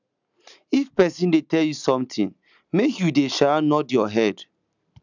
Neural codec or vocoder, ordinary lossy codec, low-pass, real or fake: none; none; 7.2 kHz; real